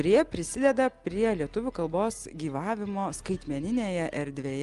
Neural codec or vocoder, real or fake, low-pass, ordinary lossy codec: none; real; 10.8 kHz; Opus, 32 kbps